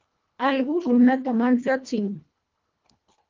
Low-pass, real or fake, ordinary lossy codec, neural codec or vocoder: 7.2 kHz; fake; Opus, 32 kbps; codec, 24 kHz, 1.5 kbps, HILCodec